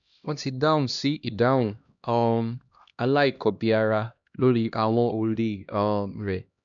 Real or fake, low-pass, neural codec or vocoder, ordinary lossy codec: fake; 7.2 kHz; codec, 16 kHz, 1 kbps, X-Codec, HuBERT features, trained on LibriSpeech; MP3, 96 kbps